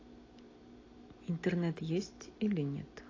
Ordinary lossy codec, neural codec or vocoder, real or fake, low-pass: AAC, 32 kbps; none; real; 7.2 kHz